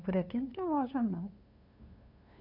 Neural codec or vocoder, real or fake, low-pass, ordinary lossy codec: codec, 16 kHz, 2 kbps, FunCodec, trained on LibriTTS, 25 frames a second; fake; 5.4 kHz; none